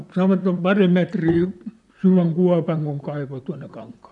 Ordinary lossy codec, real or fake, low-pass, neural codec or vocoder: none; fake; 10.8 kHz; vocoder, 44.1 kHz, 128 mel bands, Pupu-Vocoder